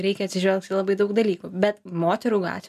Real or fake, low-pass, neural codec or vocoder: real; 14.4 kHz; none